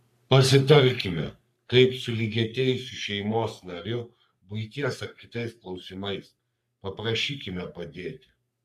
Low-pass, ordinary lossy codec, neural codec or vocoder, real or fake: 14.4 kHz; AAC, 96 kbps; codec, 44.1 kHz, 3.4 kbps, Pupu-Codec; fake